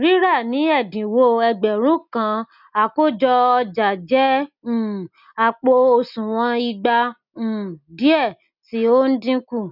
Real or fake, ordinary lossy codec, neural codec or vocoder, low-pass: real; none; none; 5.4 kHz